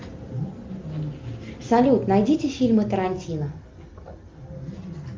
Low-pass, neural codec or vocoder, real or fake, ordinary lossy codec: 7.2 kHz; none; real; Opus, 24 kbps